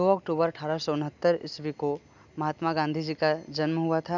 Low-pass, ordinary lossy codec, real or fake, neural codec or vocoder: 7.2 kHz; none; real; none